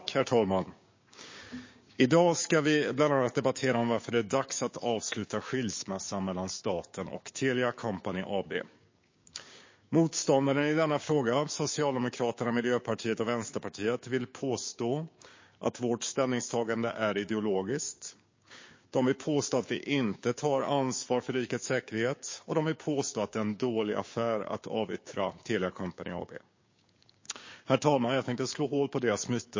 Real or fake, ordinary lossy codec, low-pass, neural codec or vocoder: fake; MP3, 32 kbps; 7.2 kHz; codec, 16 kHz, 6 kbps, DAC